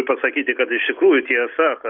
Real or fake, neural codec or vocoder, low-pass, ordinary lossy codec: real; none; 5.4 kHz; Opus, 64 kbps